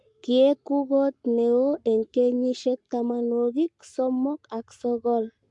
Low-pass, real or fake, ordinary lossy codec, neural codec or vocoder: 10.8 kHz; fake; MP3, 64 kbps; codec, 44.1 kHz, 7.8 kbps, Pupu-Codec